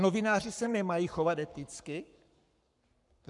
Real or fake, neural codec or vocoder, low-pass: fake; codec, 44.1 kHz, 7.8 kbps, Pupu-Codec; 10.8 kHz